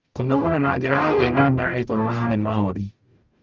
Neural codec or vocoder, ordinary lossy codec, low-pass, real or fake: codec, 44.1 kHz, 0.9 kbps, DAC; Opus, 24 kbps; 7.2 kHz; fake